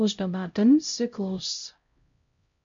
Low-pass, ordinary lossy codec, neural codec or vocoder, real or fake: 7.2 kHz; MP3, 48 kbps; codec, 16 kHz, 0.5 kbps, X-Codec, HuBERT features, trained on LibriSpeech; fake